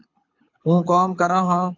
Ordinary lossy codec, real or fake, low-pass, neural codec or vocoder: AAC, 48 kbps; fake; 7.2 kHz; codec, 24 kHz, 6 kbps, HILCodec